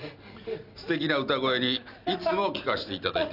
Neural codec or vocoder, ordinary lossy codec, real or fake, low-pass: none; none; real; 5.4 kHz